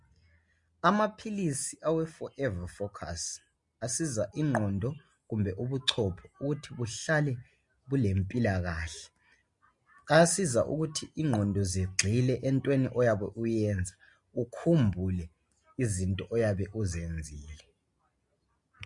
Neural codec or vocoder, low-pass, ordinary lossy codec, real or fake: none; 10.8 kHz; MP3, 48 kbps; real